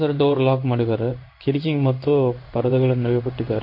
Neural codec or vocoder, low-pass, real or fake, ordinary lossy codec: codec, 16 kHz in and 24 kHz out, 1 kbps, XY-Tokenizer; 5.4 kHz; fake; MP3, 32 kbps